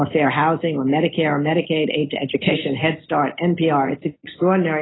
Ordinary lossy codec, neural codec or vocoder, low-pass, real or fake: AAC, 16 kbps; none; 7.2 kHz; real